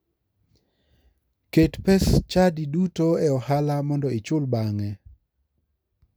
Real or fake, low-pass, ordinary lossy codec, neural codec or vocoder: real; none; none; none